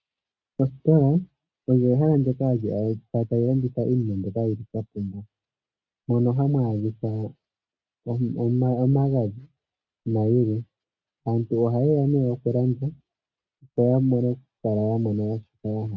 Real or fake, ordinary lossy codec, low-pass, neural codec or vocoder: real; MP3, 48 kbps; 7.2 kHz; none